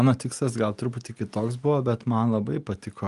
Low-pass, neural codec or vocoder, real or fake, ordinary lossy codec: 10.8 kHz; none; real; Opus, 32 kbps